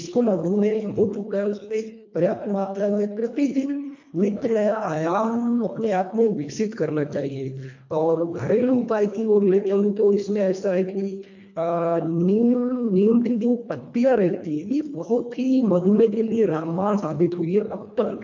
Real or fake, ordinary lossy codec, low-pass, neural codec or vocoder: fake; MP3, 48 kbps; 7.2 kHz; codec, 24 kHz, 1.5 kbps, HILCodec